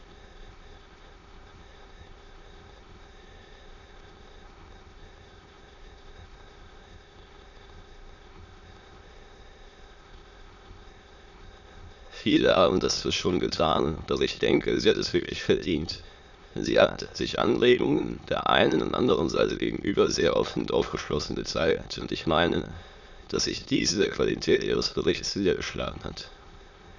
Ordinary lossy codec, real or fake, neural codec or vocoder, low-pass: none; fake; autoencoder, 22.05 kHz, a latent of 192 numbers a frame, VITS, trained on many speakers; 7.2 kHz